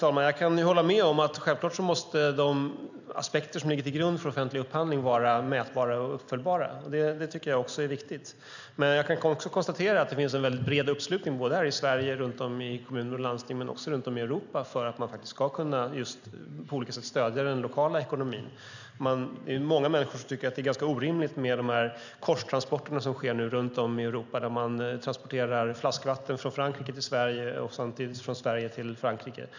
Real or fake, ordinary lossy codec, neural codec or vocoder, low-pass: real; none; none; 7.2 kHz